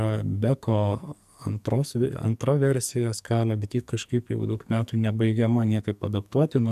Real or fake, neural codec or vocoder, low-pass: fake; codec, 44.1 kHz, 2.6 kbps, SNAC; 14.4 kHz